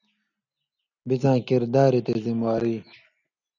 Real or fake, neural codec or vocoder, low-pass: real; none; 7.2 kHz